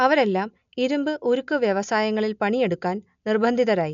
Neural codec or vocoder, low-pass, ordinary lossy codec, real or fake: none; 7.2 kHz; none; real